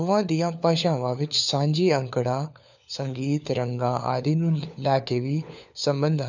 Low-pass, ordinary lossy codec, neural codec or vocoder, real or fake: 7.2 kHz; none; codec, 16 kHz, 4 kbps, FunCodec, trained on LibriTTS, 50 frames a second; fake